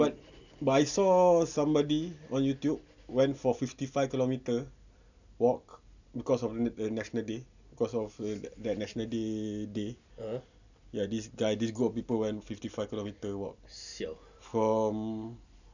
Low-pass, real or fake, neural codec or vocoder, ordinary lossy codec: 7.2 kHz; real; none; none